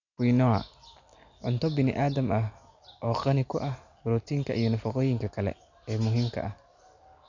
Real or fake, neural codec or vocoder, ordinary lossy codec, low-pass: real; none; none; 7.2 kHz